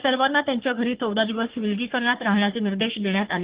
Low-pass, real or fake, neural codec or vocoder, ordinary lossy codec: 3.6 kHz; fake; codec, 44.1 kHz, 3.4 kbps, Pupu-Codec; Opus, 16 kbps